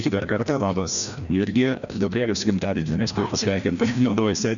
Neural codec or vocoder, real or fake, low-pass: codec, 16 kHz, 1 kbps, FreqCodec, larger model; fake; 7.2 kHz